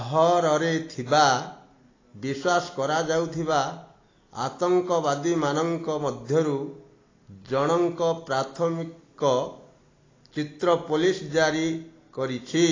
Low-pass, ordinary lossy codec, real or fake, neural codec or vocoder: 7.2 kHz; AAC, 32 kbps; real; none